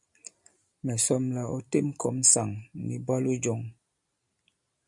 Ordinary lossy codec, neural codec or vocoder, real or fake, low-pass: MP3, 96 kbps; none; real; 10.8 kHz